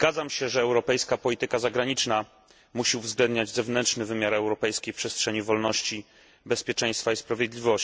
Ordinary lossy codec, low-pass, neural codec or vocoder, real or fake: none; none; none; real